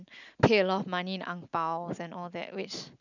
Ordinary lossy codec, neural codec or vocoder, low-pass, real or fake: none; none; 7.2 kHz; real